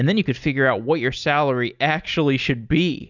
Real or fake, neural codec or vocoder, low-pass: real; none; 7.2 kHz